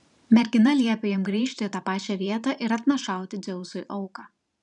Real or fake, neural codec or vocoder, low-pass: fake; vocoder, 44.1 kHz, 128 mel bands every 512 samples, BigVGAN v2; 10.8 kHz